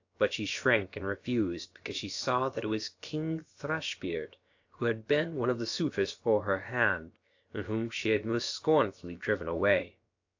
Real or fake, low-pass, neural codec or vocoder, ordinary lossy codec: fake; 7.2 kHz; codec, 16 kHz, about 1 kbps, DyCAST, with the encoder's durations; AAC, 48 kbps